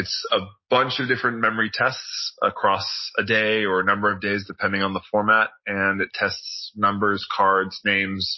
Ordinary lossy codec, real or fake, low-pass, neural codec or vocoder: MP3, 24 kbps; real; 7.2 kHz; none